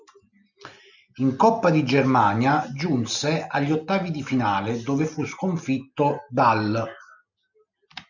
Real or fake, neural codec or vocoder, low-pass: real; none; 7.2 kHz